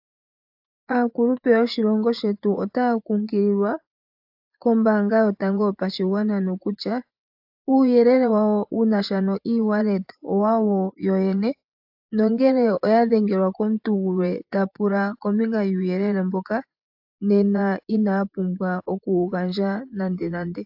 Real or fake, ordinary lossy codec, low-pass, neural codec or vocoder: fake; AAC, 48 kbps; 5.4 kHz; vocoder, 24 kHz, 100 mel bands, Vocos